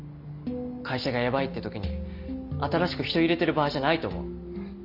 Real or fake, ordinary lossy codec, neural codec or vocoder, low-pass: real; none; none; 5.4 kHz